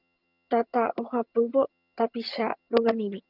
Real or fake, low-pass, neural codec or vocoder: fake; 5.4 kHz; vocoder, 22.05 kHz, 80 mel bands, HiFi-GAN